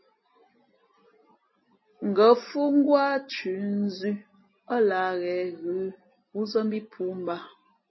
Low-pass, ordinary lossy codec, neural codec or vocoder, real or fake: 7.2 kHz; MP3, 24 kbps; none; real